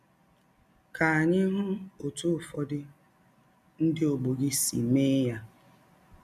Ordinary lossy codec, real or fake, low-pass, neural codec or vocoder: none; real; 14.4 kHz; none